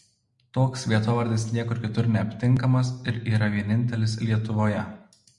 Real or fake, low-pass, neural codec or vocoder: real; 10.8 kHz; none